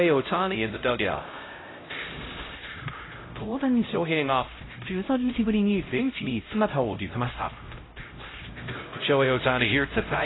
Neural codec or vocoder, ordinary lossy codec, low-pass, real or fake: codec, 16 kHz, 0.5 kbps, X-Codec, HuBERT features, trained on LibriSpeech; AAC, 16 kbps; 7.2 kHz; fake